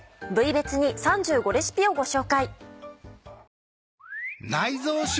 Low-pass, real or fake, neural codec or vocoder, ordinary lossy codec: none; real; none; none